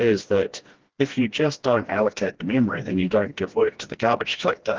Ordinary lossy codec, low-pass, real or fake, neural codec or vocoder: Opus, 16 kbps; 7.2 kHz; fake; codec, 16 kHz, 1 kbps, FreqCodec, smaller model